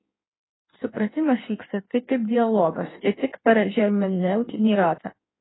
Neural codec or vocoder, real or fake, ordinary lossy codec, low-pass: codec, 16 kHz in and 24 kHz out, 1.1 kbps, FireRedTTS-2 codec; fake; AAC, 16 kbps; 7.2 kHz